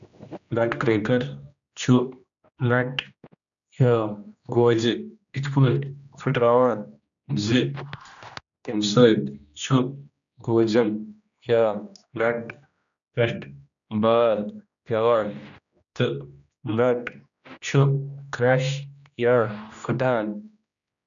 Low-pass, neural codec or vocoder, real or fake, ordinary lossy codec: 7.2 kHz; codec, 16 kHz, 1 kbps, X-Codec, HuBERT features, trained on general audio; fake; none